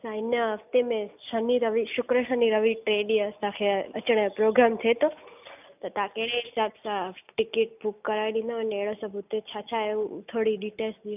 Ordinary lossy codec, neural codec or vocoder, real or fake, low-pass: none; none; real; 3.6 kHz